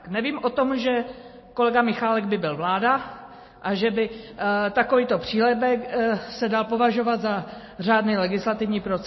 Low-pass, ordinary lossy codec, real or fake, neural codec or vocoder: 7.2 kHz; MP3, 24 kbps; real; none